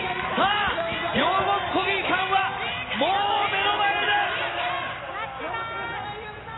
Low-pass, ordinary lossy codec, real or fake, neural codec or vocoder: 7.2 kHz; AAC, 16 kbps; real; none